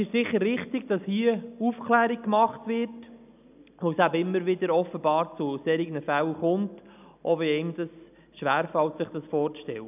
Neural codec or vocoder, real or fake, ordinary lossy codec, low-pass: none; real; none; 3.6 kHz